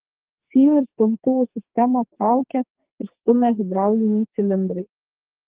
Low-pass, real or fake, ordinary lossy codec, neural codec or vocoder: 3.6 kHz; fake; Opus, 16 kbps; codec, 44.1 kHz, 2.6 kbps, SNAC